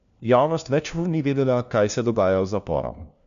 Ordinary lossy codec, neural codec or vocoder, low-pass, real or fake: none; codec, 16 kHz, 1 kbps, FunCodec, trained on LibriTTS, 50 frames a second; 7.2 kHz; fake